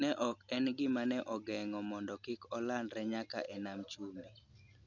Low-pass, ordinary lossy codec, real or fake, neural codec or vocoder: 7.2 kHz; none; real; none